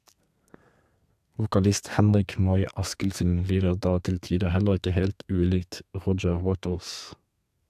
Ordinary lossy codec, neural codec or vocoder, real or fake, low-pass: AAC, 96 kbps; codec, 32 kHz, 1.9 kbps, SNAC; fake; 14.4 kHz